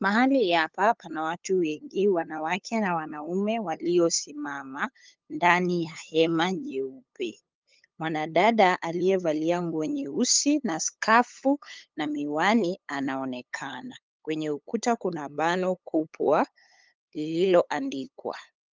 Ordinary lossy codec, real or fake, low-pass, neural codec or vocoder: Opus, 32 kbps; fake; 7.2 kHz; codec, 16 kHz, 8 kbps, FunCodec, trained on LibriTTS, 25 frames a second